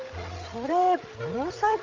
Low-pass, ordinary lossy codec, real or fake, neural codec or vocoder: 7.2 kHz; Opus, 32 kbps; fake; codec, 16 kHz, 16 kbps, FreqCodec, larger model